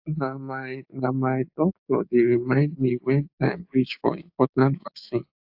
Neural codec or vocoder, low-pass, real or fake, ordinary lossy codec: codec, 16 kHz, 6 kbps, DAC; 5.4 kHz; fake; AAC, 48 kbps